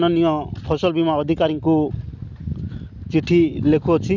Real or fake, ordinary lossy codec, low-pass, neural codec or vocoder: real; none; 7.2 kHz; none